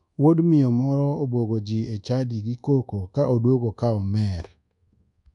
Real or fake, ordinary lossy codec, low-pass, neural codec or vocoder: fake; none; 10.8 kHz; codec, 24 kHz, 1.2 kbps, DualCodec